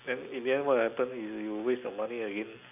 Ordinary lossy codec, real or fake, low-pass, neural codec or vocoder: none; real; 3.6 kHz; none